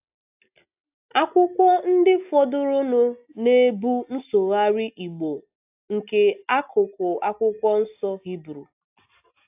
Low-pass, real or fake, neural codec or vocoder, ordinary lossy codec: 3.6 kHz; real; none; AAC, 32 kbps